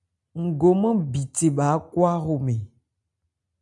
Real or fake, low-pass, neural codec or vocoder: real; 10.8 kHz; none